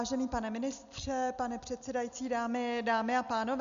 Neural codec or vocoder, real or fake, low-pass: none; real; 7.2 kHz